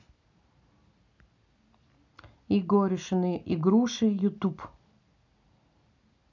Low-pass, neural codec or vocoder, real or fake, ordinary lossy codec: 7.2 kHz; none; real; none